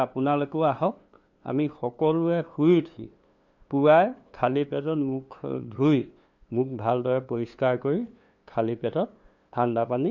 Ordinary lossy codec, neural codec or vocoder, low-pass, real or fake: none; codec, 16 kHz, 2 kbps, FunCodec, trained on LibriTTS, 25 frames a second; 7.2 kHz; fake